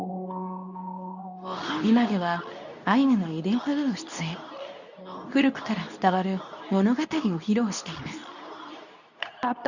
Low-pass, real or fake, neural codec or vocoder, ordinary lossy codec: 7.2 kHz; fake; codec, 24 kHz, 0.9 kbps, WavTokenizer, medium speech release version 2; none